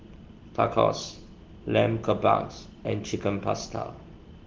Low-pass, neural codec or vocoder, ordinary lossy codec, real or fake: 7.2 kHz; none; Opus, 16 kbps; real